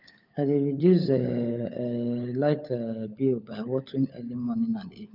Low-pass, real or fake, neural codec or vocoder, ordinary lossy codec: 5.4 kHz; fake; codec, 16 kHz, 16 kbps, FunCodec, trained on LibriTTS, 50 frames a second; none